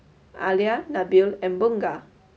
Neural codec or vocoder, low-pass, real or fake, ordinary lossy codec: none; none; real; none